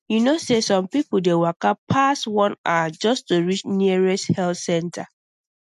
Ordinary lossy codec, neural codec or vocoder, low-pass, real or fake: MP3, 64 kbps; none; 14.4 kHz; real